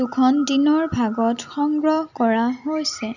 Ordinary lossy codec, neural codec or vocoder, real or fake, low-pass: none; none; real; 7.2 kHz